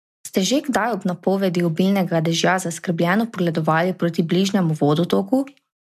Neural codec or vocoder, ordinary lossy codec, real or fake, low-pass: none; MP3, 96 kbps; real; 14.4 kHz